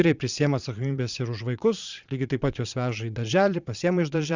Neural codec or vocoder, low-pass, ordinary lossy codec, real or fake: vocoder, 22.05 kHz, 80 mel bands, Vocos; 7.2 kHz; Opus, 64 kbps; fake